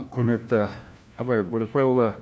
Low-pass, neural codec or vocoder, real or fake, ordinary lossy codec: none; codec, 16 kHz, 1 kbps, FunCodec, trained on LibriTTS, 50 frames a second; fake; none